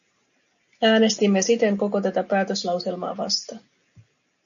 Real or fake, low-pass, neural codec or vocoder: real; 7.2 kHz; none